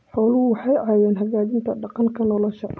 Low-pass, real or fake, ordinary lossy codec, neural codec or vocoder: none; real; none; none